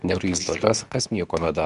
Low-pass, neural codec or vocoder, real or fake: 10.8 kHz; codec, 24 kHz, 0.9 kbps, WavTokenizer, medium speech release version 2; fake